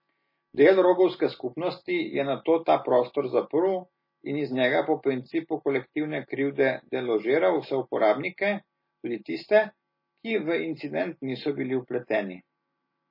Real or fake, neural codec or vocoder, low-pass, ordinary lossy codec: real; none; 5.4 kHz; MP3, 24 kbps